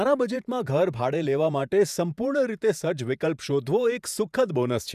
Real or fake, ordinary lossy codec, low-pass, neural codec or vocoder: fake; none; 14.4 kHz; vocoder, 48 kHz, 128 mel bands, Vocos